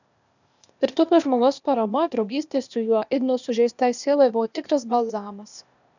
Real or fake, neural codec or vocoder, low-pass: fake; codec, 16 kHz, 0.8 kbps, ZipCodec; 7.2 kHz